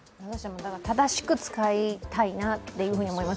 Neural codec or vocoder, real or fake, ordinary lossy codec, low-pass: none; real; none; none